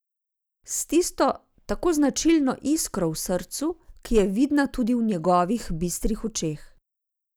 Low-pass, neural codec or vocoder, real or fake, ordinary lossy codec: none; none; real; none